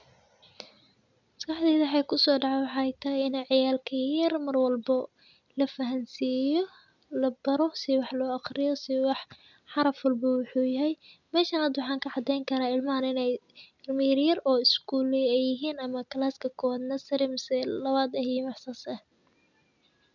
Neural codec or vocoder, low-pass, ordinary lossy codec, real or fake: none; 7.2 kHz; none; real